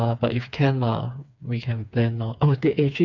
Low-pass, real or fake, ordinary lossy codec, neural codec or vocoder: 7.2 kHz; fake; none; codec, 16 kHz, 4 kbps, FreqCodec, smaller model